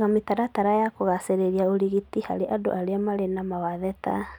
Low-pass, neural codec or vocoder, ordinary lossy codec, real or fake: 19.8 kHz; none; none; real